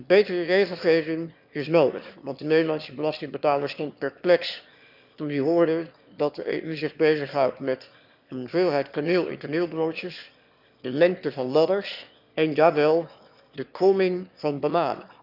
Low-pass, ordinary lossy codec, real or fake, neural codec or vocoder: 5.4 kHz; none; fake; autoencoder, 22.05 kHz, a latent of 192 numbers a frame, VITS, trained on one speaker